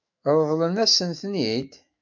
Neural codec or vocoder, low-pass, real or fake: autoencoder, 48 kHz, 128 numbers a frame, DAC-VAE, trained on Japanese speech; 7.2 kHz; fake